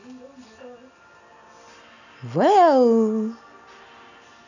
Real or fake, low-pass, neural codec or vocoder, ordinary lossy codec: real; 7.2 kHz; none; none